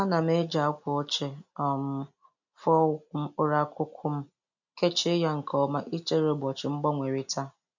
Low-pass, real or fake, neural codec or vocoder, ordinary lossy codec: 7.2 kHz; real; none; none